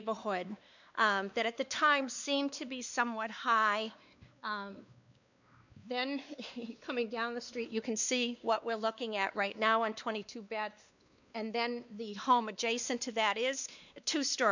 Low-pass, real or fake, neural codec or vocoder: 7.2 kHz; fake; codec, 16 kHz, 2 kbps, X-Codec, WavLM features, trained on Multilingual LibriSpeech